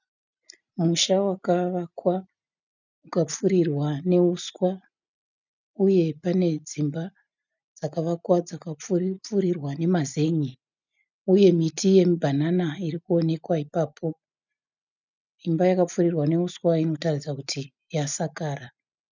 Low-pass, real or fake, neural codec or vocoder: 7.2 kHz; real; none